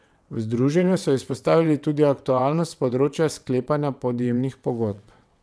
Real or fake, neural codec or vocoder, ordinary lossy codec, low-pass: fake; vocoder, 22.05 kHz, 80 mel bands, WaveNeXt; none; none